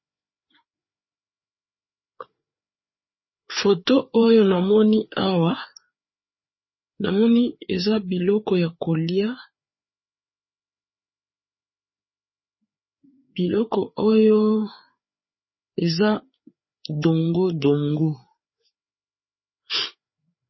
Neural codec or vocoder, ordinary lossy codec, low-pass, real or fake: codec, 16 kHz, 4 kbps, FreqCodec, larger model; MP3, 24 kbps; 7.2 kHz; fake